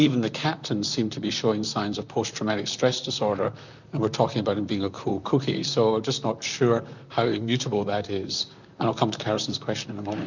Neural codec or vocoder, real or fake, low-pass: vocoder, 44.1 kHz, 128 mel bands, Pupu-Vocoder; fake; 7.2 kHz